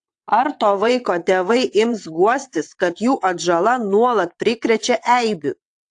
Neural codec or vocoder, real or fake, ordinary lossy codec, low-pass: vocoder, 22.05 kHz, 80 mel bands, WaveNeXt; fake; AAC, 64 kbps; 9.9 kHz